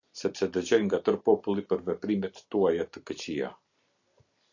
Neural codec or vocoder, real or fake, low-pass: none; real; 7.2 kHz